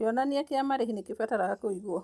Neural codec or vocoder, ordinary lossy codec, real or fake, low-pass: none; none; real; none